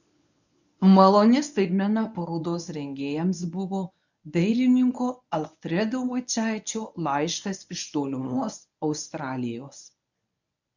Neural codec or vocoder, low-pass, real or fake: codec, 24 kHz, 0.9 kbps, WavTokenizer, medium speech release version 1; 7.2 kHz; fake